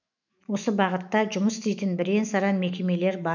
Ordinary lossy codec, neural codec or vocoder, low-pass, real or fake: none; autoencoder, 48 kHz, 128 numbers a frame, DAC-VAE, trained on Japanese speech; 7.2 kHz; fake